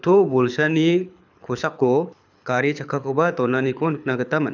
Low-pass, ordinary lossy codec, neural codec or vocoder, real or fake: 7.2 kHz; none; codec, 24 kHz, 6 kbps, HILCodec; fake